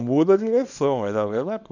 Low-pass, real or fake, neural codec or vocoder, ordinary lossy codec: 7.2 kHz; fake; codec, 24 kHz, 0.9 kbps, WavTokenizer, small release; none